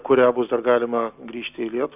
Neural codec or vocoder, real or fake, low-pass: none; real; 3.6 kHz